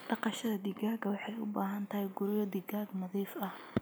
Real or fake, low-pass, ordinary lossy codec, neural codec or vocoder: real; none; none; none